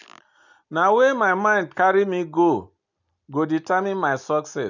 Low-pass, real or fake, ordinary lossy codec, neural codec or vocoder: 7.2 kHz; real; none; none